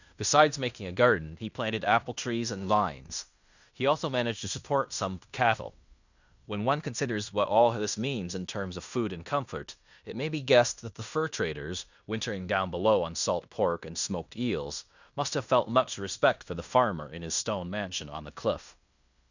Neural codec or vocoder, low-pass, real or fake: codec, 16 kHz in and 24 kHz out, 0.9 kbps, LongCat-Audio-Codec, fine tuned four codebook decoder; 7.2 kHz; fake